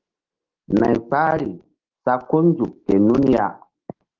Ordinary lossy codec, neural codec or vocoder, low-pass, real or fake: Opus, 16 kbps; autoencoder, 48 kHz, 128 numbers a frame, DAC-VAE, trained on Japanese speech; 7.2 kHz; fake